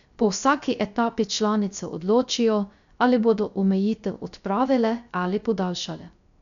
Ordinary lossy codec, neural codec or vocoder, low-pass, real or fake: none; codec, 16 kHz, 0.3 kbps, FocalCodec; 7.2 kHz; fake